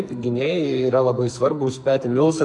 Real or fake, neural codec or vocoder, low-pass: fake; codec, 44.1 kHz, 2.6 kbps, SNAC; 10.8 kHz